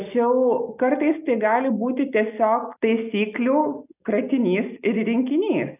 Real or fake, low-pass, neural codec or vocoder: real; 3.6 kHz; none